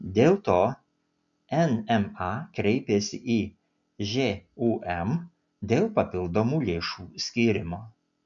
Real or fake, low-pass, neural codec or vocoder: real; 7.2 kHz; none